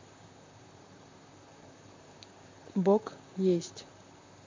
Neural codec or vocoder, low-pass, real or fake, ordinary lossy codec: vocoder, 44.1 kHz, 128 mel bands, Pupu-Vocoder; 7.2 kHz; fake; MP3, 64 kbps